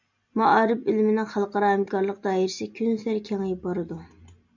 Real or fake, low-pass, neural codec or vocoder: real; 7.2 kHz; none